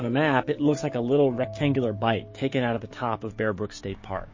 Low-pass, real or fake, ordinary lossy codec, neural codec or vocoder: 7.2 kHz; fake; MP3, 32 kbps; codec, 16 kHz, 6 kbps, DAC